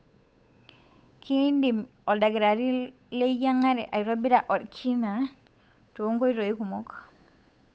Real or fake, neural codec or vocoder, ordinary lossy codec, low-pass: fake; codec, 16 kHz, 8 kbps, FunCodec, trained on Chinese and English, 25 frames a second; none; none